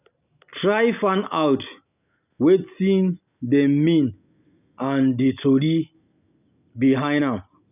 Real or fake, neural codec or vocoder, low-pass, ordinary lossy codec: real; none; 3.6 kHz; none